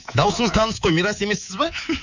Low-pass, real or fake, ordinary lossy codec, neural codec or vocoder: 7.2 kHz; fake; none; codec, 24 kHz, 3.1 kbps, DualCodec